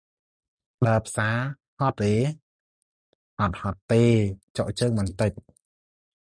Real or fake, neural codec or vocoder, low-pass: real; none; 9.9 kHz